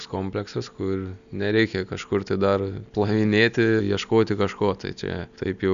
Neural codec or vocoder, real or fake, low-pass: none; real; 7.2 kHz